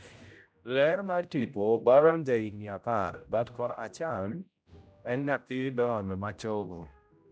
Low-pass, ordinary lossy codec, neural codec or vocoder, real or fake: none; none; codec, 16 kHz, 0.5 kbps, X-Codec, HuBERT features, trained on general audio; fake